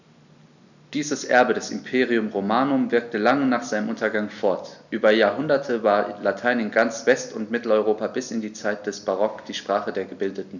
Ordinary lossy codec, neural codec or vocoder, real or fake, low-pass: none; none; real; 7.2 kHz